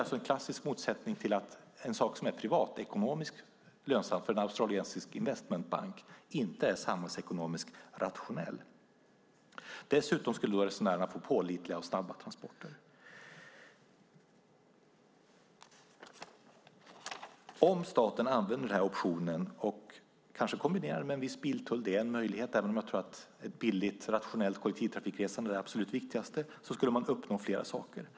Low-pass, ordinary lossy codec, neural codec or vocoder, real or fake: none; none; none; real